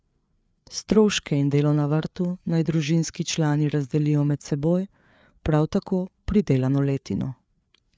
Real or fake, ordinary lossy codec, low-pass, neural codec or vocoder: fake; none; none; codec, 16 kHz, 4 kbps, FreqCodec, larger model